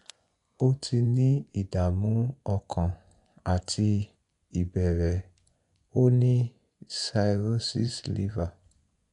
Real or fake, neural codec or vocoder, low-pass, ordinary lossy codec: fake; vocoder, 24 kHz, 100 mel bands, Vocos; 10.8 kHz; none